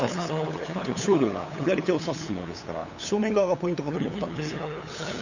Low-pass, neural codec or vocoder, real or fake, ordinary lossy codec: 7.2 kHz; codec, 16 kHz, 8 kbps, FunCodec, trained on LibriTTS, 25 frames a second; fake; none